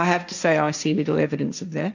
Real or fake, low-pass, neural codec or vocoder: fake; 7.2 kHz; codec, 16 kHz, 1.1 kbps, Voila-Tokenizer